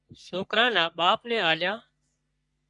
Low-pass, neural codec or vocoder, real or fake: 10.8 kHz; codec, 44.1 kHz, 3.4 kbps, Pupu-Codec; fake